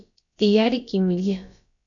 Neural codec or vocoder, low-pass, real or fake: codec, 16 kHz, about 1 kbps, DyCAST, with the encoder's durations; 7.2 kHz; fake